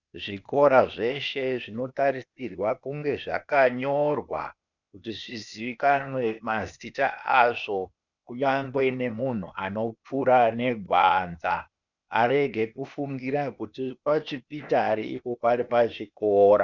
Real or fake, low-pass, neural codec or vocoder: fake; 7.2 kHz; codec, 16 kHz, 0.8 kbps, ZipCodec